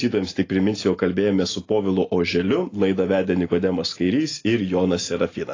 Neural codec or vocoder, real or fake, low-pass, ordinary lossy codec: none; real; 7.2 kHz; AAC, 32 kbps